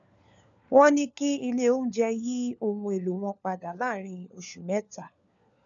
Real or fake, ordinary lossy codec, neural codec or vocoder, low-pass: fake; none; codec, 16 kHz, 4 kbps, FunCodec, trained on LibriTTS, 50 frames a second; 7.2 kHz